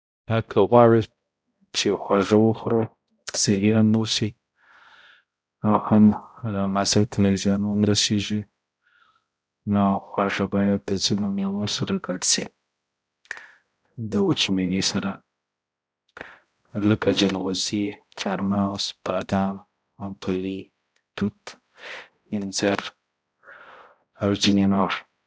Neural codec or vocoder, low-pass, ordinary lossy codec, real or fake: codec, 16 kHz, 0.5 kbps, X-Codec, HuBERT features, trained on balanced general audio; none; none; fake